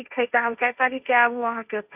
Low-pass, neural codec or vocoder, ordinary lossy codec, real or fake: 3.6 kHz; codec, 16 kHz, 1.1 kbps, Voila-Tokenizer; none; fake